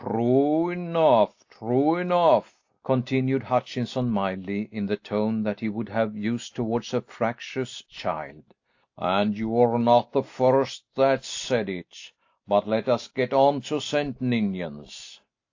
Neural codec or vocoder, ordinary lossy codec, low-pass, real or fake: none; AAC, 48 kbps; 7.2 kHz; real